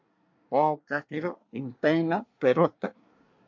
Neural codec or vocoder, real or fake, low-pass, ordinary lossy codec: codec, 24 kHz, 1 kbps, SNAC; fake; 7.2 kHz; MP3, 48 kbps